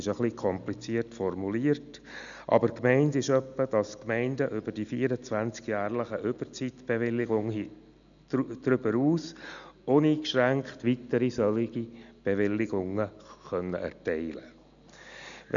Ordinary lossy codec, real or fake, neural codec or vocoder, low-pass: none; real; none; 7.2 kHz